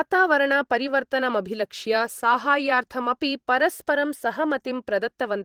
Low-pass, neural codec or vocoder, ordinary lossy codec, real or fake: 19.8 kHz; vocoder, 44.1 kHz, 128 mel bands every 512 samples, BigVGAN v2; Opus, 16 kbps; fake